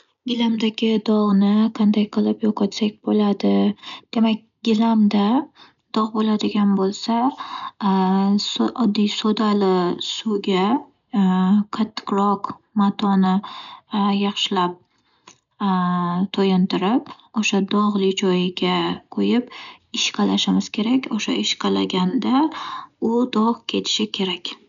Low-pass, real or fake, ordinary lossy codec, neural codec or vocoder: 7.2 kHz; real; none; none